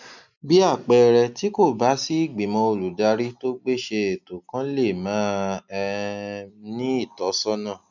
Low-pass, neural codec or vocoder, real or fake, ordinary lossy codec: 7.2 kHz; none; real; none